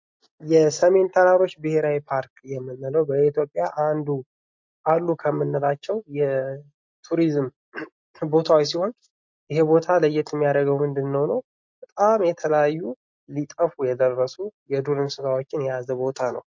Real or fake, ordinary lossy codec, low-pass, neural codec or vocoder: real; MP3, 48 kbps; 7.2 kHz; none